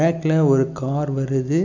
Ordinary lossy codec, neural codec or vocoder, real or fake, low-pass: none; none; real; 7.2 kHz